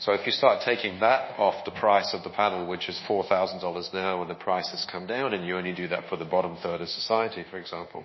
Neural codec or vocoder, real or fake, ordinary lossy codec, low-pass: codec, 24 kHz, 1.2 kbps, DualCodec; fake; MP3, 24 kbps; 7.2 kHz